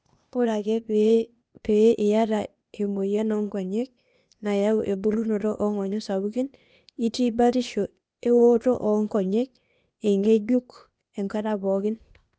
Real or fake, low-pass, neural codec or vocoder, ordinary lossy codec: fake; none; codec, 16 kHz, 0.8 kbps, ZipCodec; none